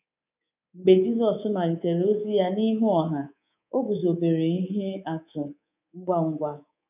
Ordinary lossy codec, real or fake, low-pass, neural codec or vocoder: none; fake; 3.6 kHz; codec, 24 kHz, 3.1 kbps, DualCodec